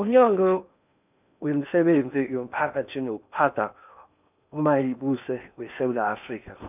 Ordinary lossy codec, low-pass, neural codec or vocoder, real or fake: none; 3.6 kHz; codec, 16 kHz in and 24 kHz out, 0.8 kbps, FocalCodec, streaming, 65536 codes; fake